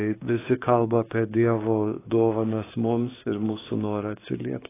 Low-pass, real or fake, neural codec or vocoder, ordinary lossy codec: 3.6 kHz; fake; codec, 16 kHz, 6 kbps, DAC; AAC, 16 kbps